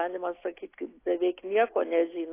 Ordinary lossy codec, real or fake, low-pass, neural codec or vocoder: AAC, 24 kbps; real; 3.6 kHz; none